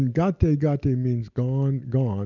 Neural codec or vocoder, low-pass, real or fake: none; 7.2 kHz; real